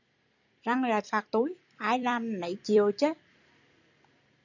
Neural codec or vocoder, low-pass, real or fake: vocoder, 44.1 kHz, 128 mel bands every 256 samples, BigVGAN v2; 7.2 kHz; fake